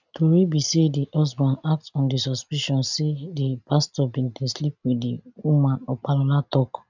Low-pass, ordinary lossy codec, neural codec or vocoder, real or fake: 7.2 kHz; none; none; real